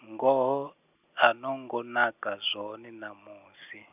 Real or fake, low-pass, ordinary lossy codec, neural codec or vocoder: real; 3.6 kHz; none; none